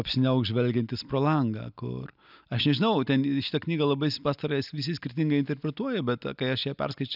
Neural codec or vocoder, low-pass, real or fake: none; 5.4 kHz; real